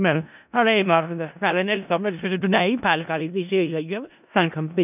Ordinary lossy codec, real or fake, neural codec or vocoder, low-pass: none; fake; codec, 16 kHz in and 24 kHz out, 0.4 kbps, LongCat-Audio-Codec, four codebook decoder; 3.6 kHz